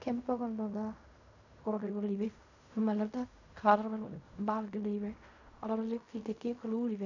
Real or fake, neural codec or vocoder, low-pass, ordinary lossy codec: fake; codec, 16 kHz in and 24 kHz out, 0.4 kbps, LongCat-Audio-Codec, fine tuned four codebook decoder; 7.2 kHz; none